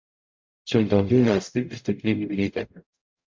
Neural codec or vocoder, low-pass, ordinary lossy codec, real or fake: codec, 44.1 kHz, 0.9 kbps, DAC; 7.2 kHz; MP3, 64 kbps; fake